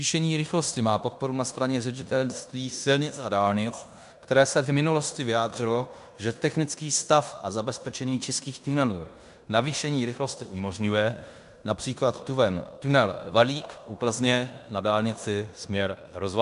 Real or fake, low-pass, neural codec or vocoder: fake; 10.8 kHz; codec, 16 kHz in and 24 kHz out, 0.9 kbps, LongCat-Audio-Codec, fine tuned four codebook decoder